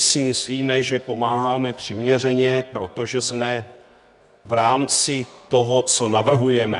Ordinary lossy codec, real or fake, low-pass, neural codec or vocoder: MP3, 96 kbps; fake; 10.8 kHz; codec, 24 kHz, 0.9 kbps, WavTokenizer, medium music audio release